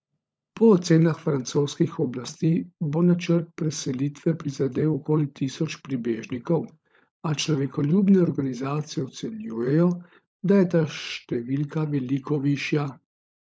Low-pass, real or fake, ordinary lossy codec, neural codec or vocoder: none; fake; none; codec, 16 kHz, 16 kbps, FunCodec, trained on LibriTTS, 50 frames a second